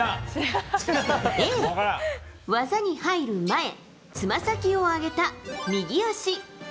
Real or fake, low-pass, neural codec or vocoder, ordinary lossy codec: real; none; none; none